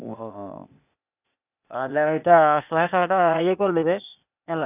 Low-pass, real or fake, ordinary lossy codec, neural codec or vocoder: 3.6 kHz; fake; none; codec, 16 kHz, 0.8 kbps, ZipCodec